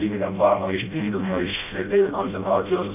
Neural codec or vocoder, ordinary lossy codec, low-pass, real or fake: codec, 16 kHz, 0.5 kbps, FreqCodec, smaller model; AAC, 32 kbps; 3.6 kHz; fake